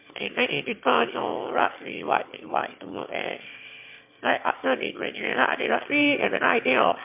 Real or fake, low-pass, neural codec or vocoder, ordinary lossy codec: fake; 3.6 kHz; autoencoder, 22.05 kHz, a latent of 192 numbers a frame, VITS, trained on one speaker; MP3, 32 kbps